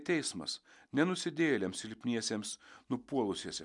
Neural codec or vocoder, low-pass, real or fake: none; 10.8 kHz; real